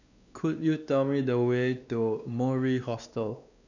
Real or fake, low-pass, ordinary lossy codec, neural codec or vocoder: fake; 7.2 kHz; none; codec, 16 kHz, 2 kbps, X-Codec, WavLM features, trained on Multilingual LibriSpeech